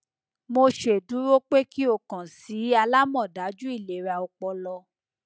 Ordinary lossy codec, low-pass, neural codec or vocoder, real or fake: none; none; none; real